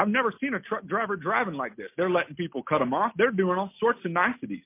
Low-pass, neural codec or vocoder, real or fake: 3.6 kHz; none; real